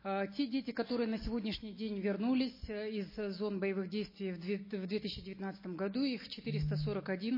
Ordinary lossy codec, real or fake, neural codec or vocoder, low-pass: MP3, 24 kbps; real; none; 5.4 kHz